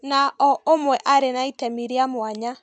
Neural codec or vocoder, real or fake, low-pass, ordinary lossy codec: none; real; 9.9 kHz; none